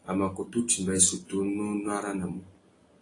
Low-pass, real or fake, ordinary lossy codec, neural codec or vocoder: 10.8 kHz; real; AAC, 32 kbps; none